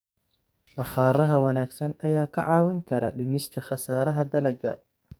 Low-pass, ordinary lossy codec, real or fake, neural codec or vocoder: none; none; fake; codec, 44.1 kHz, 2.6 kbps, SNAC